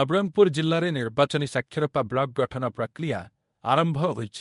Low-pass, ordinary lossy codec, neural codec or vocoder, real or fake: 10.8 kHz; MP3, 64 kbps; codec, 24 kHz, 0.9 kbps, WavTokenizer, medium speech release version 1; fake